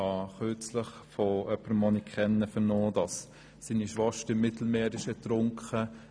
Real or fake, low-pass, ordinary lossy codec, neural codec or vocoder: real; none; none; none